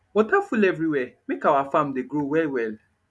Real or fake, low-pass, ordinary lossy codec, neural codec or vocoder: real; none; none; none